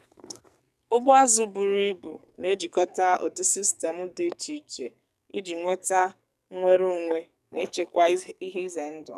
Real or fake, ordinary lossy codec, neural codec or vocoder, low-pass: fake; none; codec, 44.1 kHz, 2.6 kbps, SNAC; 14.4 kHz